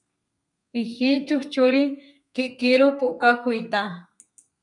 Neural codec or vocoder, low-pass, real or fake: codec, 32 kHz, 1.9 kbps, SNAC; 10.8 kHz; fake